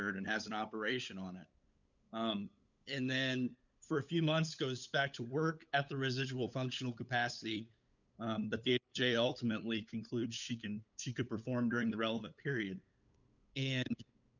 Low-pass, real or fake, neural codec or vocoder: 7.2 kHz; fake; codec, 16 kHz, 8 kbps, FunCodec, trained on LibriTTS, 25 frames a second